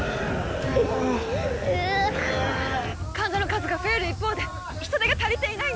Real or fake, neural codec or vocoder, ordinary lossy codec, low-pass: real; none; none; none